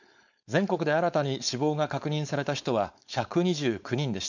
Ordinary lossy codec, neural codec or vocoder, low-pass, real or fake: none; codec, 16 kHz, 4.8 kbps, FACodec; 7.2 kHz; fake